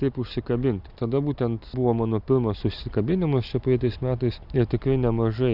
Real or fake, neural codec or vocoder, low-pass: real; none; 5.4 kHz